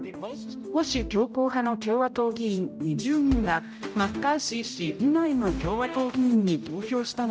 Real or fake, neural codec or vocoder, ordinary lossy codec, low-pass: fake; codec, 16 kHz, 0.5 kbps, X-Codec, HuBERT features, trained on general audio; none; none